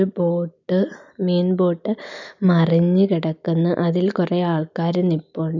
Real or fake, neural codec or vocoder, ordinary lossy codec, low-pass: real; none; none; 7.2 kHz